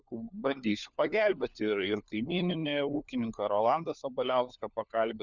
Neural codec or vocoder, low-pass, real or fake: codec, 16 kHz, 4 kbps, FunCodec, trained on LibriTTS, 50 frames a second; 7.2 kHz; fake